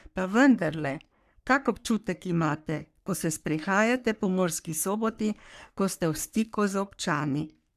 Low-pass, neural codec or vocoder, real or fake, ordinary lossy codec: 14.4 kHz; codec, 44.1 kHz, 3.4 kbps, Pupu-Codec; fake; none